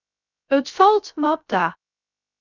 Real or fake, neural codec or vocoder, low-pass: fake; codec, 16 kHz, 0.3 kbps, FocalCodec; 7.2 kHz